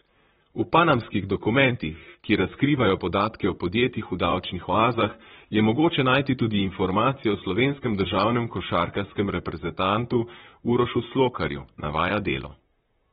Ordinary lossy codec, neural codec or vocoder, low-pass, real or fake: AAC, 16 kbps; vocoder, 44.1 kHz, 128 mel bands, Pupu-Vocoder; 19.8 kHz; fake